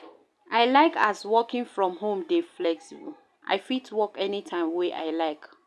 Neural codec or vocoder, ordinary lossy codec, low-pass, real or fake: none; none; none; real